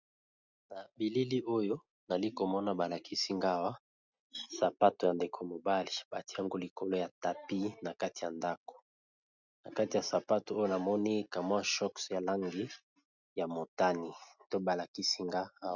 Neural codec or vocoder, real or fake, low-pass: none; real; 7.2 kHz